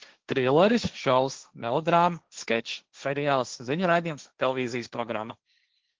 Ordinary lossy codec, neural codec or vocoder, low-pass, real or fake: Opus, 16 kbps; codec, 16 kHz, 1.1 kbps, Voila-Tokenizer; 7.2 kHz; fake